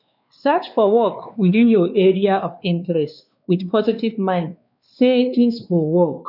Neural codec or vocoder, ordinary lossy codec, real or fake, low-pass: codec, 16 kHz, 2 kbps, X-Codec, WavLM features, trained on Multilingual LibriSpeech; none; fake; 5.4 kHz